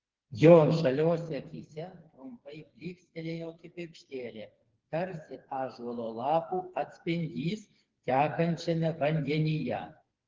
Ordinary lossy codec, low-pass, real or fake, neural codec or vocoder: Opus, 16 kbps; 7.2 kHz; fake; codec, 16 kHz, 4 kbps, FreqCodec, smaller model